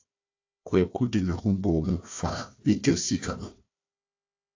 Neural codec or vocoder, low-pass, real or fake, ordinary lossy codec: codec, 16 kHz, 1 kbps, FunCodec, trained on Chinese and English, 50 frames a second; 7.2 kHz; fake; AAC, 48 kbps